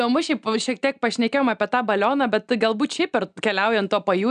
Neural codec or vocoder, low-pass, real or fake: none; 9.9 kHz; real